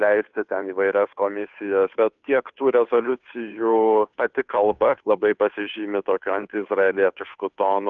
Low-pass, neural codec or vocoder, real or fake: 7.2 kHz; codec, 16 kHz, 2 kbps, FunCodec, trained on Chinese and English, 25 frames a second; fake